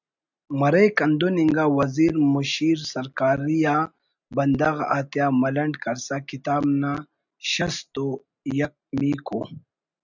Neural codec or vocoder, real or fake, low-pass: none; real; 7.2 kHz